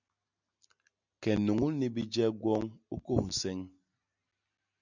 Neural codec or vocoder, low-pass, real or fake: none; 7.2 kHz; real